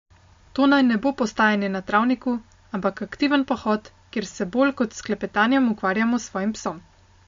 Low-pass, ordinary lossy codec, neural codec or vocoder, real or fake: 7.2 kHz; MP3, 48 kbps; none; real